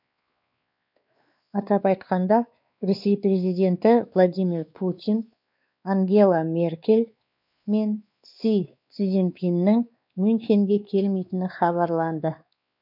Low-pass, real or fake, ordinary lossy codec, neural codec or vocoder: 5.4 kHz; fake; none; codec, 16 kHz, 2 kbps, X-Codec, WavLM features, trained on Multilingual LibriSpeech